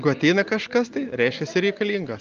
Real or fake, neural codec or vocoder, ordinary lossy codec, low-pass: real; none; Opus, 24 kbps; 7.2 kHz